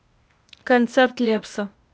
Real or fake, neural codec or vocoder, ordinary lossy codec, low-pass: fake; codec, 16 kHz, 0.8 kbps, ZipCodec; none; none